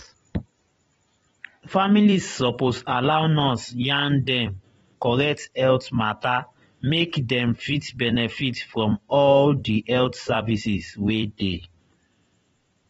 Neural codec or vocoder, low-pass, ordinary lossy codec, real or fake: vocoder, 44.1 kHz, 128 mel bands every 256 samples, BigVGAN v2; 19.8 kHz; AAC, 24 kbps; fake